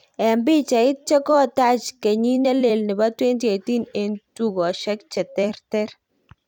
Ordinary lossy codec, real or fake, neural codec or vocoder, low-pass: none; fake; vocoder, 44.1 kHz, 128 mel bands every 256 samples, BigVGAN v2; 19.8 kHz